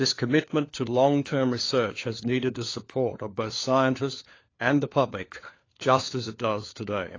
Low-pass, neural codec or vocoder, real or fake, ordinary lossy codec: 7.2 kHz; codec, 16 kHz, 4 kbps, FunCodec, trained on LibriTTS, 50 frames a second; fake; AAC, 32 kbps